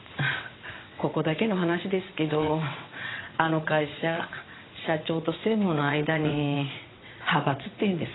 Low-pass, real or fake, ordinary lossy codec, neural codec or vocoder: 7.2 kHz; fake; AAC, 16 kbps; vocoder, 22.05 kHz, 80 mel bands, WaveNeXt